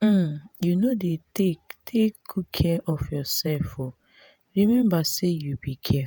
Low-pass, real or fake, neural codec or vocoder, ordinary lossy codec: none; fake; vocoder, 48 kHz, 128 mel bands, Vocos; none